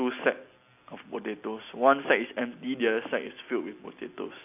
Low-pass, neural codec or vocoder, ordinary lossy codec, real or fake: 3.6 kHz; none; AAC, 32 kbps; real